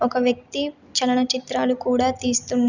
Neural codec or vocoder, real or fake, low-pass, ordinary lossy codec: none; real; 7.2 kHz; none